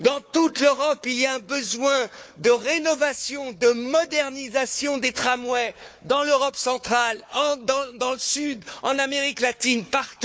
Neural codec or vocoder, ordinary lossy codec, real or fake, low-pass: codec, 16 kHz, 4 kbps, FunCodec, trained on Chinese and English, 50 frames a second; none; fake; none